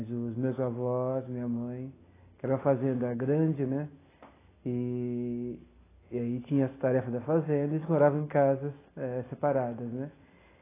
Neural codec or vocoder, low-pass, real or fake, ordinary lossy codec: none; 3.6 kHz; real; AAC, 16 kbps